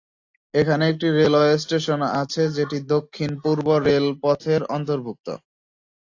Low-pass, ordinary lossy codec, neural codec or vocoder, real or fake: 7.2 kHz; AAC, 48 kbps; none; real